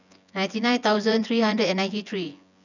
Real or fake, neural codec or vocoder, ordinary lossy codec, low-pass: fake; vocoder, 24 kHz, 100 mel bands, Vocos; none; 7.2 kHz